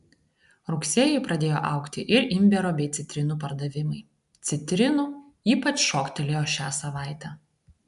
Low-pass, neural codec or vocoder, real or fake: 10.8 kHz; none; real